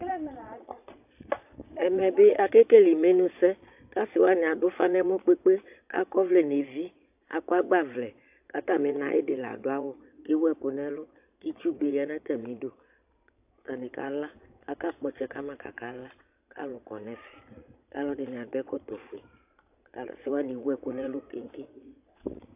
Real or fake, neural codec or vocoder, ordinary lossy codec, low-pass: fake; vocoder, 22.05 kHz, 80 mel bands, Vocos; AAC, 32 kbps; 3.6 kHz